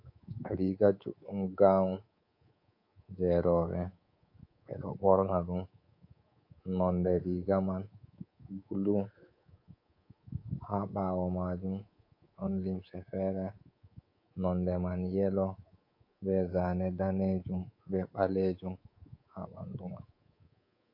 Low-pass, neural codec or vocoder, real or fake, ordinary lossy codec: 5.4 kHz; codec, 24 kHz, 3.1 kbps, DualCodec; fake; MP3, 32 kbps